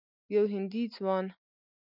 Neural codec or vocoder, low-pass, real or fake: none; 5.4 kHz; real